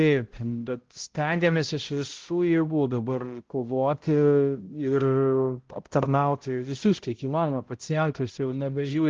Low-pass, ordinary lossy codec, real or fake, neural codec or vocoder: 7.2 kHz; Opus, 16 kbps; fake; codec, 16 kHz, 0.5 kbps, X-Codec, HuBERT features, trained on balanced general audio